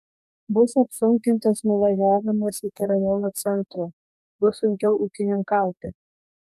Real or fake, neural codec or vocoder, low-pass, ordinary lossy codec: fake; codec, 44.1 kHz, 2.6 kbps, DAC; 14.4 kHz; MP3, 96 kbps